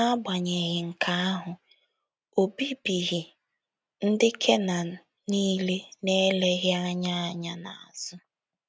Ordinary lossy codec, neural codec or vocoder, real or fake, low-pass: none; none; real; none